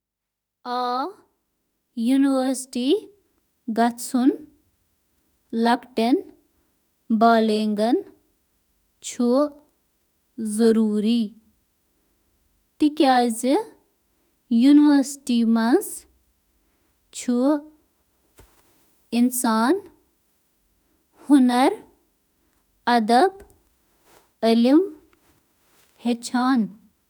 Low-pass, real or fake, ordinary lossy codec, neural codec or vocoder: none; fake; none; autoencoder, 48 kHz, 32 numbers a frame, DAC-VAE, trained on Japanese speech